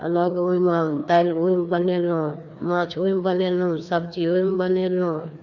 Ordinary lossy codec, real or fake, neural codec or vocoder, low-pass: none; fake; codec, 16 kHz, 2 kbps, FreqCodec, larger model; 7.2 kHz